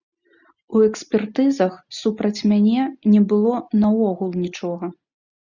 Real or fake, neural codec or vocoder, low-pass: real; none; 7.2 kHz